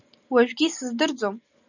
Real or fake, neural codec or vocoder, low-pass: real; none; 7.2 kHz